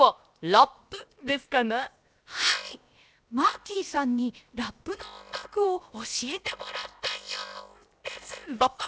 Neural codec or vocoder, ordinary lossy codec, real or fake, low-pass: codec, 16 kHz, 0.7 kbps, FocalCodec; none; fake; none